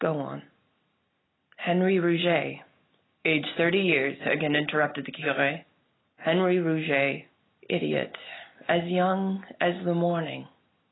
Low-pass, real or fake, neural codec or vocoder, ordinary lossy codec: 7.2 kHz; real; none; AAC, 16 kbps